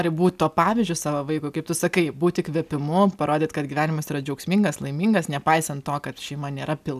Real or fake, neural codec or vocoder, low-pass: real; none; 14.4 kHz